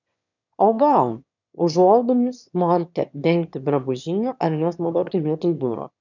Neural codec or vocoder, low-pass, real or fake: autoencoder, 22.05 kHz, a latent of 192 numbers a frame, VITS, trained on one speaker; 7.2 kHz; fake